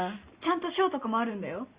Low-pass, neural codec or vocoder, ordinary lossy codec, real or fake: 3.6 kHz; none; Opus, 64 kbps; real